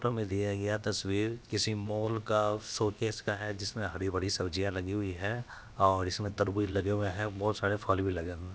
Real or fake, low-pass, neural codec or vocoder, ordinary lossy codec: fake; none; codec, 16 kHz, about 1 kbps, DyCAST, with the encoder's durations; none